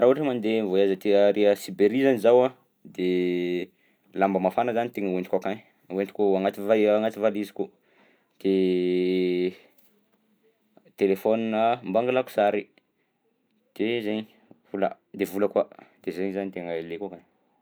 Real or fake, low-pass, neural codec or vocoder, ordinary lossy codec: real; none; none; none